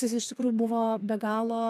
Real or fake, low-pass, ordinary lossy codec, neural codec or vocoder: fake; 14.4 kHz; AAC, 96 kbps; codec, 32 kHz, 1.9 kbps, SNAC